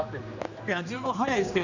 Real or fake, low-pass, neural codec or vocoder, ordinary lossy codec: fake; 7.2 kHz; codec, 16 kHz, 2 kbps, X-Codec, HuBERT features, trained on general audio; none